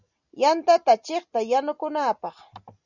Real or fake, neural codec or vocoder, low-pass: real; none; 7.2 kHz